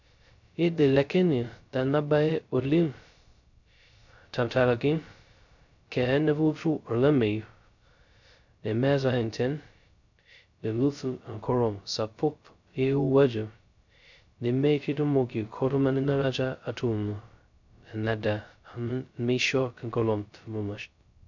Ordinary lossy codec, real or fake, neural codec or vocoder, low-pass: none; fake; codec, 16 kHz, 0.2 kbps, FocalCodec; 7.2 kHz